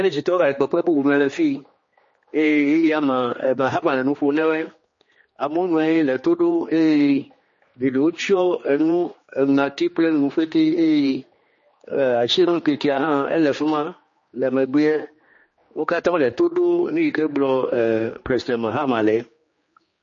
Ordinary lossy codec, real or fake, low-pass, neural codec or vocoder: MP3, 32 kbps; fake; 7.2 kHz; codec, 16 kHz, 2 kbps, X-Codec, HuBERT features, trained on general audio